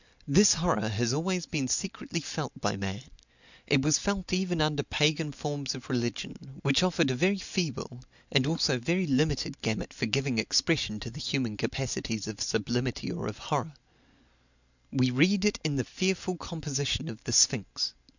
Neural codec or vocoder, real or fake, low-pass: none; real; 7.2 kHz